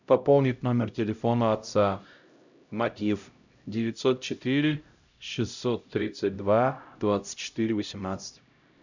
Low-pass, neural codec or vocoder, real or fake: 7.2 kHz; codec, 16 kHz, 0.5 kbps, X-Codec, HuBERT features, trained on LibriSpeech; fake